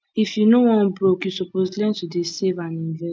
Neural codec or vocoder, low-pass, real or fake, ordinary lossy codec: none; none; real; none